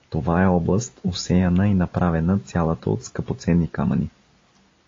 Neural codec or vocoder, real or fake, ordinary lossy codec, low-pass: none; real; AAC, 32 kbps; 7.2 kHz